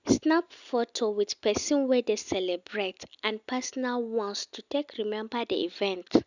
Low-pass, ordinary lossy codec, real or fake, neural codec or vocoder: 7.2 kHz; none; real; none